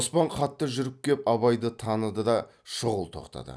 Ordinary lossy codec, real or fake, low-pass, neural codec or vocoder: none; real; none; none